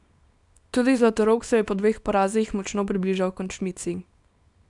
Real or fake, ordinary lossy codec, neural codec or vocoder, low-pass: fake; none; codec, 24 kHz, 0.9 kbps, WavTokenizer, small release; 10.8 kHz